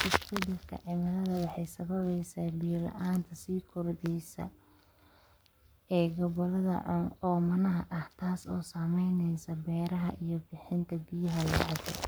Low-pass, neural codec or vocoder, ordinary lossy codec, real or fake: none; codec, 44.1 kHz, 7.8 kbps, Pupu-Codec; none; fake